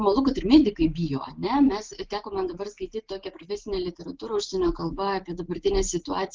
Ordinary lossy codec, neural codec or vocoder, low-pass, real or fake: Opus, 16 kbps; none; 7.2 kHz; real